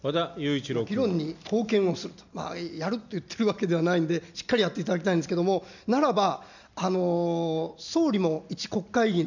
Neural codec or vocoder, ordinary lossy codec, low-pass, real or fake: none; none; 7.2 kHz; real